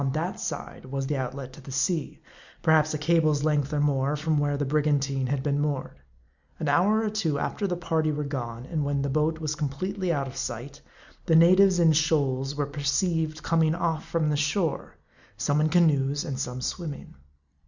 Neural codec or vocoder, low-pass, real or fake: none; 7.2 kHz; real